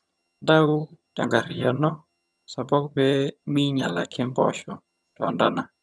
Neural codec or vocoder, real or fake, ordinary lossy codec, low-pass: vocoder, 22.05 kHz, 80 mel bands, HiFi-GAN; fake; none; none